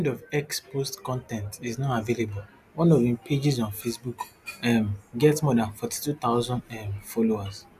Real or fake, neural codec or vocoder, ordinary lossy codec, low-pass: real; none; none; 14.4 kHz